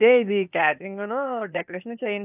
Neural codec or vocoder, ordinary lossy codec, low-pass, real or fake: codec, 16 kHz, 0.8 kbps, ZipCodec; none; 3.6 kHz; fake